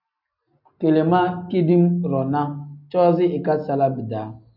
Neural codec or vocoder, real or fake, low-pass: none; real; 5.4 kHz